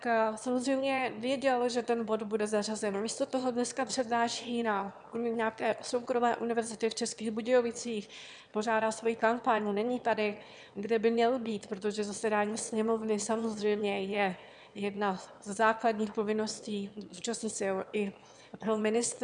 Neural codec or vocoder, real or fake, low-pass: autoencoder, 22.05 kHz, a latent of 192 numbers a frame, VITS, trained on one speaker; fake; 9.9 kHz